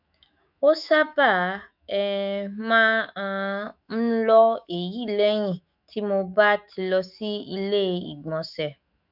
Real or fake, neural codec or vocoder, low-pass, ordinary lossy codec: fake; autoencoder, 48 kHz, 128 numbers a frame, DAC-VAE, trained on Japanese speech; 5.4 kHz; none